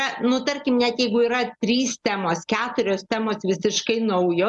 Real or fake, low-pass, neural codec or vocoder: real; 10.8 kHz; none